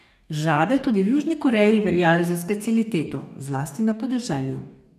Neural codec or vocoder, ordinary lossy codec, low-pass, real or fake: codec, 44.1 kHz, 2.6 kbps, DAC; none; 14.4 kHz; fake